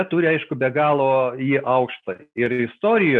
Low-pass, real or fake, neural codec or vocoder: 10.8 kHz; real; none